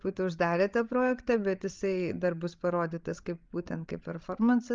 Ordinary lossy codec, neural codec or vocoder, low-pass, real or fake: Opus, 32 kbps; codec, 16 kHz, 16 kbps, FreqCodec, larger model; 7.2 kHz; fake